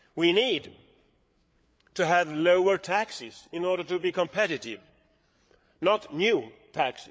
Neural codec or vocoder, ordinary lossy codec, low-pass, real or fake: codec, 16 kHz, 8 kbps, FreqCodec, larger model; none; none; fake